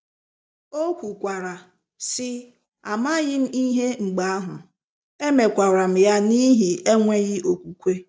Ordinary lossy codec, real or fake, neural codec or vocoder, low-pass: none; real; none; none